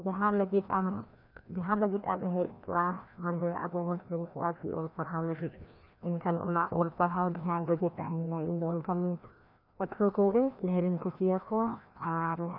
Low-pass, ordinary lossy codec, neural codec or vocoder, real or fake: 5.4 kHz; none; codec, 16 kHz, 1 kbps, FreqCodec, larger model; fake